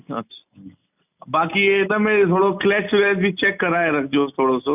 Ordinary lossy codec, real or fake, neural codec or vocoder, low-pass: none; real; none; 3.6 kHz